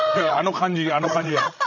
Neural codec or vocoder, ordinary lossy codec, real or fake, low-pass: codec, 16 kHz, 16 kbps, FreqCodec, larger model; none; fake; 7.2 kHz